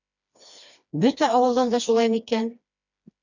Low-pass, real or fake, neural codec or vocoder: 7.2 kHz; fake; codec, 16 kHz, 2 kbps, FreqCodec, smaller model